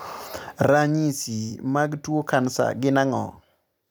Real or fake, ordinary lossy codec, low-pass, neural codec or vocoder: fake; none; none; vocoder, 44.1 kHz, 128 mel bands every 256 samples, BigVGAN v2